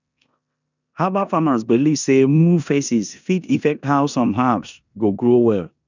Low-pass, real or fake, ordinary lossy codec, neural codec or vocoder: 7.2 kHz; fake; none; codec, 16 kHz in and 24 kHz out, 0.9 kbps, LongCat-Audio-Codec, four codebook decoder